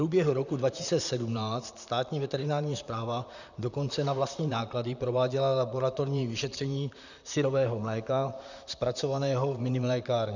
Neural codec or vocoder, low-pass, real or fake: vocoder, 44.1 kHz, 128 mel bands, Pupu-Vocoder; 7.2 kHz; fake